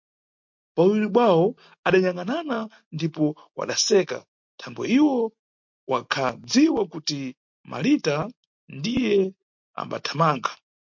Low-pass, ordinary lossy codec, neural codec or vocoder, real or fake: 7.2 kHz; MP3, 48 kbps; none; real